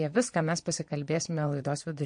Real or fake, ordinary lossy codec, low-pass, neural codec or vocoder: fake; MP3, 48 kbps; 9.9 kHz; vocoder, 22.05 kHz, 80 mel bands, Vocos